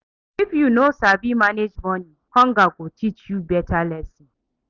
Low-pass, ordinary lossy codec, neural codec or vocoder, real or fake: 7.2 kHz; Opus, 64 kbps; none; real